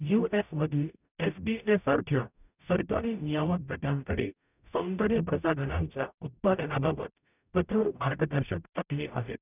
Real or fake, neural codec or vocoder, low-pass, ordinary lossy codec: fake; codec, 44.1 kHz, 0.9 kbps, DAC; 3.6 kHz; none